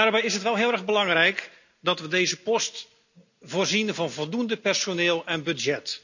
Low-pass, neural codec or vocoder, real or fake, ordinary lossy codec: 7.2 kHz; none; real; none